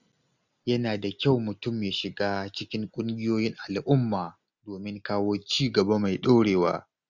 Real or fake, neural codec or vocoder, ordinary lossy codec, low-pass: real; none; none; 7.2 kHz